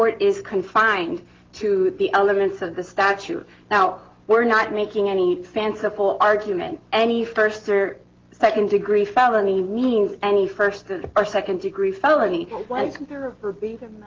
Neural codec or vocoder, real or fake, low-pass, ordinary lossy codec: none; real; 7.2 kHz; Opus, 16 kbps